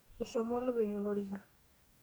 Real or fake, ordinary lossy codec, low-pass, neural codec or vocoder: fake; none; none; codec, 44.1 kHz, 2.6 kbps, DAC